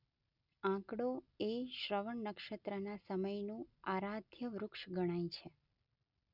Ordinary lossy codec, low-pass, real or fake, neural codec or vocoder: none; 5.4 kHz; real; none